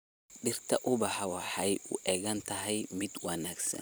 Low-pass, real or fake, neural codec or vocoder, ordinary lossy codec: none; fake; vocoder, 44.1 kHz, 128 mel bands every 256 samples, BigVGAN v2; none